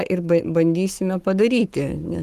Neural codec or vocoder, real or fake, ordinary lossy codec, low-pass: codec, 44.1 kHz, 7.8 kbps, Pupu-Codec; fake; Opus, 24 kbps; 14.4 kHz